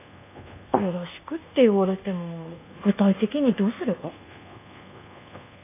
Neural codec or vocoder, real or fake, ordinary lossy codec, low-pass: codec, 24 kHz, 1.2 kbps, DualCodec; fake; none; 3.6 kHz